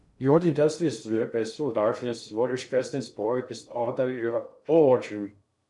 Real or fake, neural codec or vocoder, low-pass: fake; codec, 16 kHz in and 24 kHz out, 0.6 kbps, FocalCodec, streaming, 2048 codes; 10.8 kHz